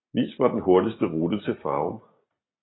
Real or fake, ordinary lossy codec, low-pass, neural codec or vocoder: real; AAC, 16 kbps; 7.2 kHz; none